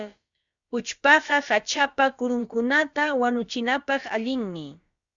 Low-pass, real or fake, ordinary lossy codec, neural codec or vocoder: 7.2 kHz; fake; Opus, 64 kbps; codec, 16 kHz, about 1 kbps, DyCAST, with the encoder's durations